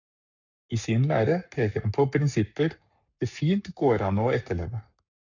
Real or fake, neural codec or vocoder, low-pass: fake; codec, 44.1 kHz, 7.8 kbps, Pupu-Codec; 7.2 kHz